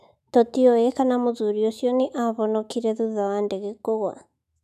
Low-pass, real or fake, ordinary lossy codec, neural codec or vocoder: 14.4 kHz; fake; none; autoencoder, 48 kHz, 128 numbers a frame, DAC-VAE, trained on Japanese speech